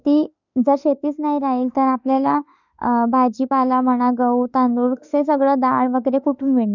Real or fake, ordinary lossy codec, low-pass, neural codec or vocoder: fake; none; 7.2 kHz; autoencoder, 48 kHz, 32 numbers a frame, DAC-VAE, trained on Japanese speech